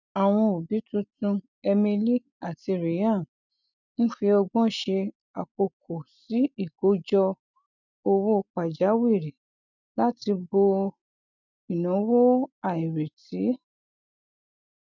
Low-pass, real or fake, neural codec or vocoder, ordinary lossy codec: 7.2 kHz; real; none; none